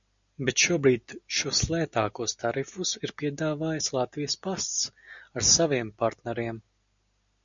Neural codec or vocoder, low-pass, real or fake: none; 7.2 kHz; real